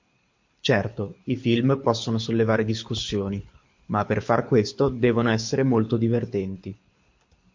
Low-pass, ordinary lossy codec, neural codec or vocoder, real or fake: 7.2 kHz; MP3, 48 kbps; codec, 24 kHz, 6 kbps, HILCodec; fake